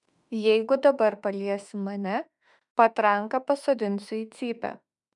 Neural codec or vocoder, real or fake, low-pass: autoencoder, 48 kHz, 32 numbers a frame, DAC-VAE, trained on Japanese speech; fake; 10.8 kHz